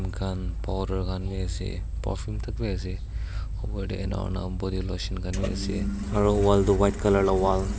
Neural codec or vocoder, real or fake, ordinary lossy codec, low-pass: none; real; none; none